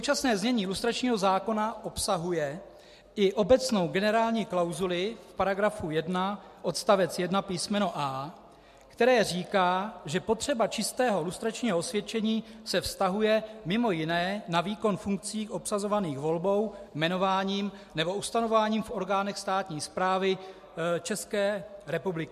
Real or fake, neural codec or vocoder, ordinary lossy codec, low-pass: real; none; MP3, 64 kbps; 14.4 kHz